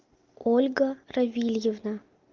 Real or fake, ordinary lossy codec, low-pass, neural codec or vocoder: real; Opus, 24 kbps; 7.2 kHz; none